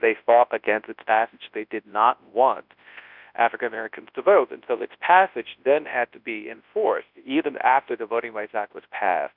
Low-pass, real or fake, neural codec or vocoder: 5.4 kHz; fake; codec, 24 kHz, 0.9 kbps, WavTokenizer, large speech release